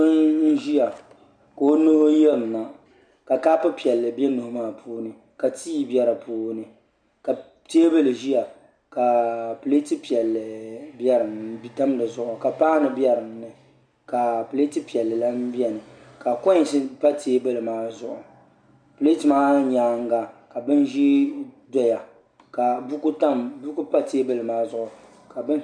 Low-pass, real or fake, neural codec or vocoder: 9.9 kHz; real; none